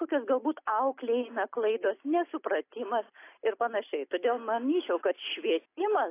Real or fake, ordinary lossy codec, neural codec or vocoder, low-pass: real; AAC, 24 kbps; none; 3.6 kHz